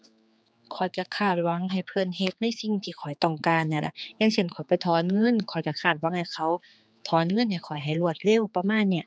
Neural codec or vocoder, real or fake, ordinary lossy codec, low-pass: codec, 16 kHz, 4 kbps, X-Codec, HuBERT features, trained on general audio; fake; none; none